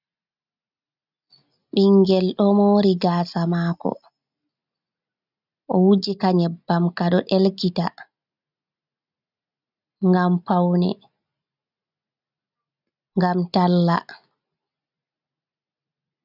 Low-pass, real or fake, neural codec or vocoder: 5.4 kHz; real; none